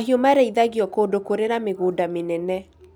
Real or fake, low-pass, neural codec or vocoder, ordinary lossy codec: real; none; none; none